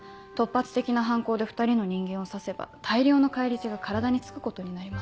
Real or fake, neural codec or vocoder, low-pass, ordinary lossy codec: real; none; none; none